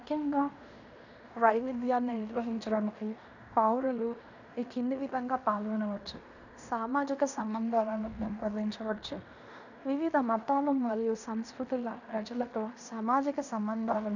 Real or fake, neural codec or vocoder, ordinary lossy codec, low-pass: fake; codec, 16 kHz in and 24 kHz out, 0.9 kbps, LongCat-Audio-Codec, fine tuned four codebook decoder; none; 7.2 kHz